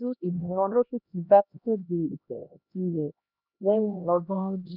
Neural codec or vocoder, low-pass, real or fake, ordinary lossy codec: codec, 16 kHz, 1 kbps, X-Codec, HuBERT features, trained on LibriSpeech; 5.4 kHz; fake; none